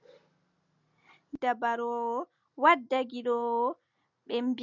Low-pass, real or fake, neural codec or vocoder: 7.2 kHz; real; none